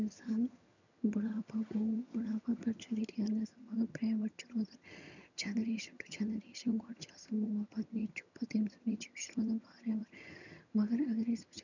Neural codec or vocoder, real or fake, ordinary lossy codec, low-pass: vocoder, 22.05 kHz, 80 mel bands, HiFi-GAN; fake; none; 7.2 kHz